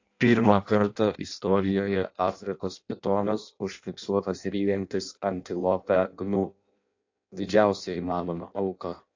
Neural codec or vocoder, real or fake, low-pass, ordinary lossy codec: codec, 16 kHz in and 24 kHz out, 0.6 kbps, FireRedTTS-2 codec; fake; 7.2 kHz; AAC, 48 kbps